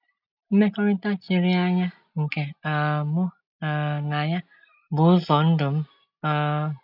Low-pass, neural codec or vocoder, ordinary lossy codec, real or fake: 5.4 kHz; none; none; real